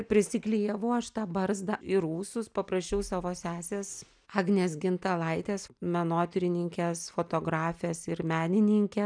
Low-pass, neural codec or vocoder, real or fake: 9.9 kHz; none; real